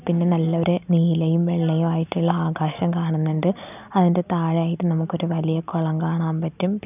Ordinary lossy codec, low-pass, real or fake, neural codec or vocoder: none; 3.6 kHz; real; none